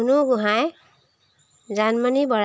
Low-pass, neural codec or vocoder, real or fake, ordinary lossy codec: none; none; real; none